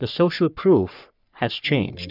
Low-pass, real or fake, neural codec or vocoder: 5.4 kHz; fake; codec, 44.1 kHz, 3.4 kbps, Pupu-Codec